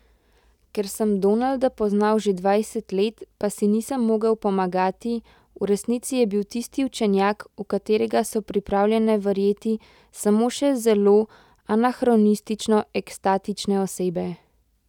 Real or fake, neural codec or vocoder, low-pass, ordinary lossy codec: real; none; 19.8 kHz; none